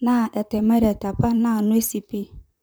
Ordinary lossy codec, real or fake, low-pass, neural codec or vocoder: none; fake; none; vocoder, 44.1 kHz, 128 mel bands, Pupu-Vocoder